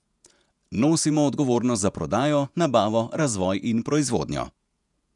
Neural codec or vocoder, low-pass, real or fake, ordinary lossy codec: vocoder, 48 kHz, 128 mel bands, Vocos; 10.8 kHz; fake; none